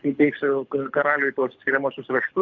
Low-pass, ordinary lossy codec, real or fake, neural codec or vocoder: 7.2 kHz; AAC, 48 kbps; fake; codec, 24 kHz, 6 kbps, HILCodec